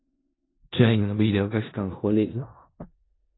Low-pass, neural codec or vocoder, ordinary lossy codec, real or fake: 7.2 kHz; codec, 16 kHz in and 24 kHz out, 0.4 kbps, LongCat-Audio-Codec, four codebook decoder; AAC, 16 kbps; fake